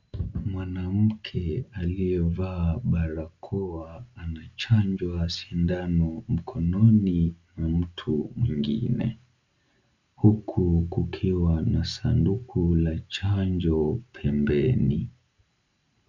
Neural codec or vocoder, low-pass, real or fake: none; 7.2 kHz; real